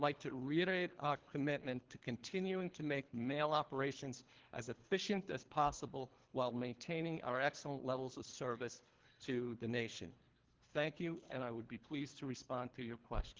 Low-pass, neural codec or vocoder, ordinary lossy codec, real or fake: 7.2 kHz; codec, 24 kHz, 3 kbps, HILCodec; Opus, 16 kbps; fake